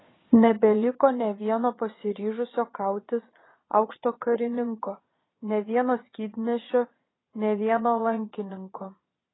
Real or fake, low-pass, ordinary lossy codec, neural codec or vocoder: fake; 7.2 kHz; AAC, 16 kbps; vocoder, 22.05 kHz, 80 mel bands, Vocos